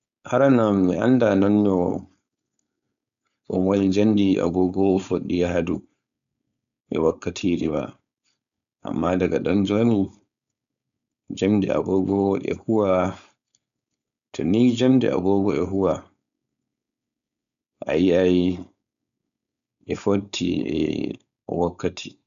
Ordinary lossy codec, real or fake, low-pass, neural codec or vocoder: none; fake; 7.2 kHz; codec, 16 kHz, 4.8 kbps, FACodec